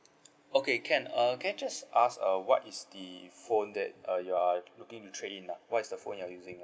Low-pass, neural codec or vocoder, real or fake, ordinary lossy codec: none; none; real; none